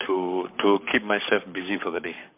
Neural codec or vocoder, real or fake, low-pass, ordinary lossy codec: codec, 44.1 kHz, 7.8 kbps, Pupu-Codec; fake; 3.6 kHz; MP3, 32 kbps